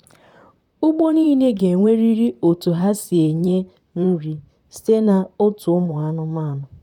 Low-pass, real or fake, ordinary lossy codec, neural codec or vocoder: 19.8 kHz; fake; none; vocoder, 44.1 kHz, 128 mel bands, Pupu-Vocoder